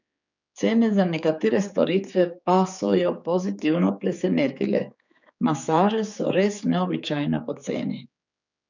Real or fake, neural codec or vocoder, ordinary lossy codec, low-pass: fake; codec, 16 kHz, 4 kbps, X-Codec, HuBERT features, trained on general audio; none; 7.2 kHz